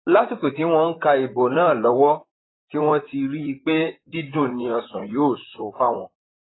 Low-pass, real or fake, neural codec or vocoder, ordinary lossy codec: 7.2 kHz; fake; vocoder, 44.1 kHz, 128 mel bands, Pupu-Vocoder; AAC, 16 kbps